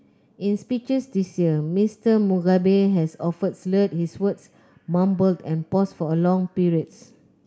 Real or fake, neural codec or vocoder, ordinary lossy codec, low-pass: real; none; none; none